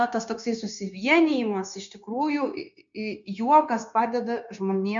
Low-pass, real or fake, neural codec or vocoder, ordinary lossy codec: 7.2 kHz; fake; codec, 16 kHz, 0.9 kbps, LongCat-Audio-Codec; MP3, 64 kbps